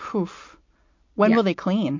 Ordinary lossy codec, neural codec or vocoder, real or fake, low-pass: MP3, 48 kbps; none; real; 7.2 kHz